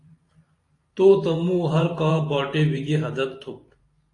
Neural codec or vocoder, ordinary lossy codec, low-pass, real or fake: vocoder, 44.1 kHz, 128 mel bands every 512 samples, BigVGAN v2; AAC, 48 kbps; 10.8 kHz; fake